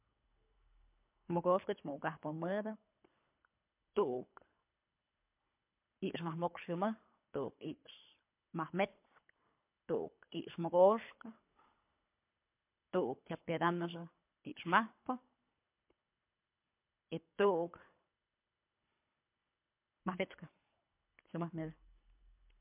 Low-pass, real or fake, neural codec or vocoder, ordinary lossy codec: 3.6 kHz; fake; codec, 24 kHz, 3 kbps, HILCodec; MP3, 32 kbps